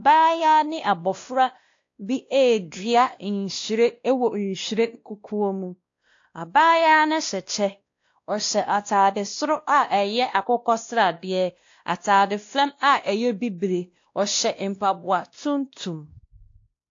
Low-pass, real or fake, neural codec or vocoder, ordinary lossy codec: 7.2 kHz; fake; codec, 16 kHz, 1 kbps, X-Codec, WavLM features, trained on Multilingual LibriSpeech; AAC, 48 kbps